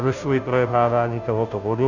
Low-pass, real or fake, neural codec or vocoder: 7.2 kHz; fake; codec, 16 kHz, 0.5 kbps, FunCodec, trained on Chinese and English, 25 frames a second